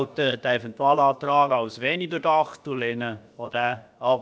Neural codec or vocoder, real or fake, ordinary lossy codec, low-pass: codec, 16 kHz, about 1 kbps, DyCAST, with the encoder's durations; fake; none; none